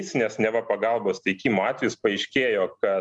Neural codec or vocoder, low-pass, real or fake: none; 10.8 kHz; real